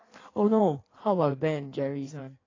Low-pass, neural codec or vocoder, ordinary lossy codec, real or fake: 7.2 kHz; codec, 16 kHz in and 24 kHz out, 1.1 kbps, FireRedTTS-2 codec; AAC, 32 kbps; fake